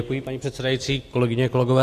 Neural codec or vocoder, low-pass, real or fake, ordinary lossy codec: none; 14.4 kHz; real; AAC, 64 kbps